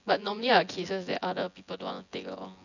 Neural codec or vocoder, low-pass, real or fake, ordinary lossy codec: vocoder, 24 kHz, 100 mel bands, Vocos; 7.2 kHz; fake; none